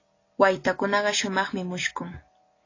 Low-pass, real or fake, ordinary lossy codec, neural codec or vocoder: 7.2 kHz; real; AAC, 32 kbps; none